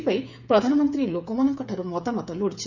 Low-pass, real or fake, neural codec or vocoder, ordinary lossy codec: 7.2 kHz; fake; codec, 16 kHz, 8 kbps, FreqCodec, smaller model; none